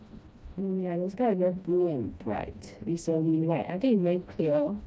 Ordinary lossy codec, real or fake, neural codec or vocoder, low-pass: none; fake; codec, 16 kHz, 1 kbps, FreqCodec, smaller model; none